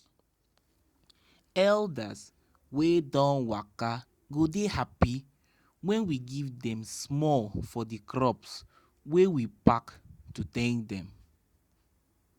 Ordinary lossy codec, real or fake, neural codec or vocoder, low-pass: Opus, 64 kbps; real; none; 19.8 kHz